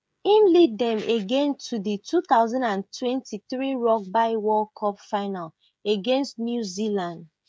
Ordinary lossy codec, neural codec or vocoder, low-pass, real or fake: none; codec, 16 kHz, 16 kbps, FreqCodec, smaller model; none; fake